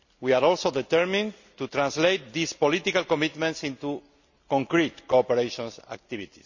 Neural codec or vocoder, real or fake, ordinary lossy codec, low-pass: none; real; none; 7.2 kHz